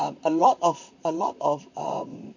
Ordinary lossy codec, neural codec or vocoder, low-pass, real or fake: none; vocoder, 44.1 kHz, 128 mel bands, Pupu-Vocoder; 7.2 kHz; fake